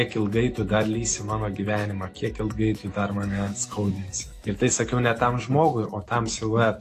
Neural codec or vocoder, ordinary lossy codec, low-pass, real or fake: autoencoder, 48 kHz, 128 numbers a frame, DAC-VAE, trained on Japanese speech; AAC, 48 kbps; 14.4 kHz; fake